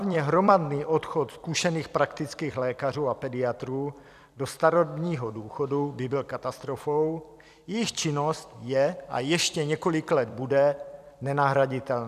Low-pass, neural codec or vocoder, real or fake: 14.4 kHz; vocoder, 44.1 kHz, 128 mel bands every 256 samples, BigVGAN v2; fake